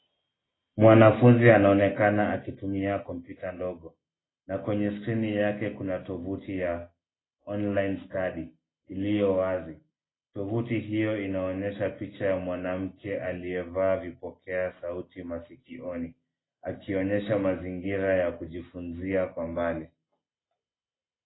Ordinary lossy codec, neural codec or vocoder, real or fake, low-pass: AAC, 16 kbps; none; real; 7.2 kHz